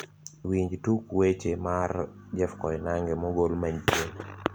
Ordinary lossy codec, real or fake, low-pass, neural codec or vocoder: none; real; none; none